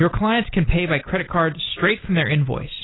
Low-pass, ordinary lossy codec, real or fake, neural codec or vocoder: 7.2 kHz; AAC, 16 kbps; real; none